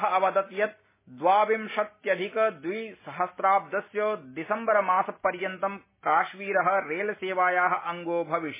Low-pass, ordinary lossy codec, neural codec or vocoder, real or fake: 3.6 kHz; MP3, 16 kbps; none; real